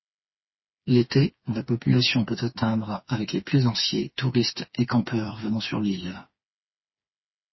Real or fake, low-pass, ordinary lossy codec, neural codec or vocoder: fake; 7.2 kHz; MP3, 24 kbps; codec, 16 kHz, 4 kbps, FreqCodec, smaller model